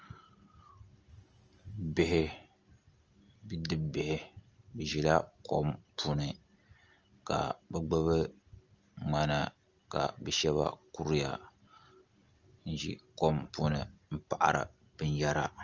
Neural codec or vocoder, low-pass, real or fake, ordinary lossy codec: none; 7.2 kHz; real; Opus, 24 kbps